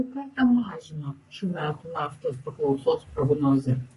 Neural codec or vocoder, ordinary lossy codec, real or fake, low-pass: codec, 44.1 kHz, 3.4 kbps, Pupu-Codec; MP3, 48 kbps; fake; 14.4 kHz